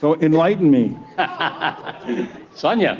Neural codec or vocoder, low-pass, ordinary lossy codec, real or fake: none; 7.2 kHz; Opus, 16 kbps; real